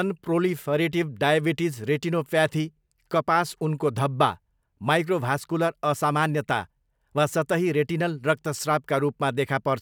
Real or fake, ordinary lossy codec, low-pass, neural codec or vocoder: real; none; none; none